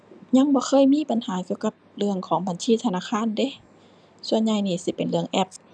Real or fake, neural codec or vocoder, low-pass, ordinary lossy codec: real; none; 9.9 kHz; none